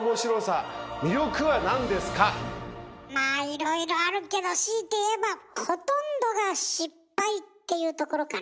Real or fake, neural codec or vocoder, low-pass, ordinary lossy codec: real; none; none; none